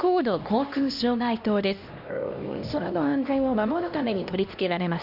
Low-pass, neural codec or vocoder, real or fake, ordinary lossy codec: 5.4 kHz; codec, 16 kHz, 1 kbps, X-Codec, HuBERT features, trained on LibriSpeech; fake; none